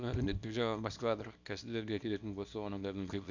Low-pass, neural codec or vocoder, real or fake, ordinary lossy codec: 7.2 kHz; codec, 24 kHz, 0.9 kbps, WavTokenizer, small release; fake; none